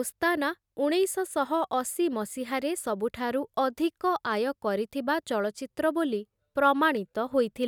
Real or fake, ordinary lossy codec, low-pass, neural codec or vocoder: real; none; 19.8 kHz; none